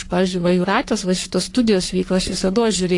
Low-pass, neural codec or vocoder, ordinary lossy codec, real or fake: 10.8 kHz; codec, 44.1 kHz, 3.4 kbps, Pupu-Codec; AAC, 48 kbps; fake